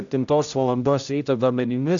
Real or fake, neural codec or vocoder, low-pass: fake; codec, 16 kHz, 0.5 kbps, X-Codec, HuBERT features, trained on general audio; 7.2 kHz